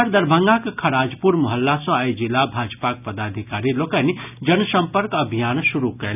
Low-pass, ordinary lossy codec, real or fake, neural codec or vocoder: 3.6 kHz; none; real; none